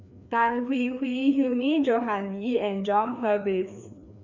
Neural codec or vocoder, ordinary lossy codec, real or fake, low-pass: codec, 16 kHz, 2 kbps, FreqCodec, larger model; none; fake; 7.2 kHz